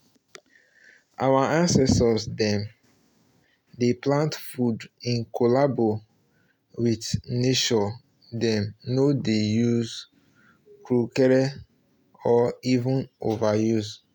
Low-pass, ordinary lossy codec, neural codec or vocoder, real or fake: 19.8 kHz; none; none; real